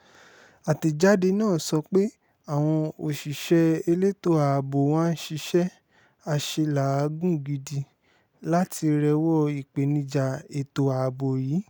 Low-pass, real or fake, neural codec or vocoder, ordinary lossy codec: none; real; none; none